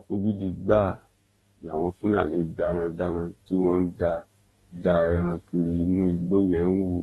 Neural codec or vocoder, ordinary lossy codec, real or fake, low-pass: codec, 44.1 kHz, 2.6 kbps, DAC; AAC, 32 kbps; fake; 19.8 kHz